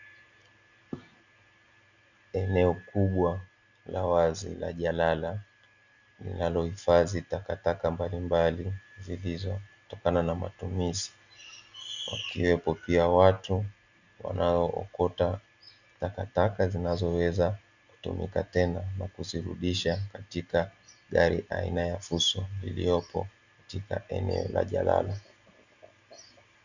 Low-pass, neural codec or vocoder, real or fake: 7.2 kHz; none; real